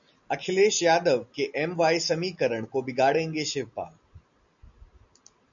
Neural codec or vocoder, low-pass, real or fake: none; 7.2 kHz; real